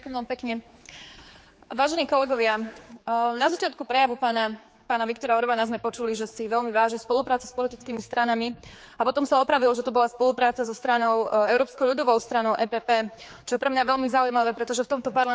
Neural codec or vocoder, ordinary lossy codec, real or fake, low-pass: codec, 16 kHz, 4 kbps, X-Codec, HuBERT features, trained on general audio; none; fake; none